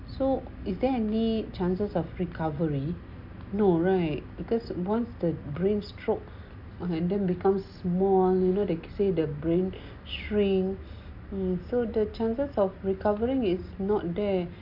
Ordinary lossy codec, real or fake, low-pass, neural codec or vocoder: AAC, 48 kbps; real; 5.4 kHz; none